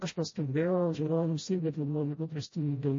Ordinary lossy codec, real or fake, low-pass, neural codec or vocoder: MP3, 32 kbps; fake; 7.2 kHz; codec, 16 kHz, 0.5 kbps, FreqCodec, smaller model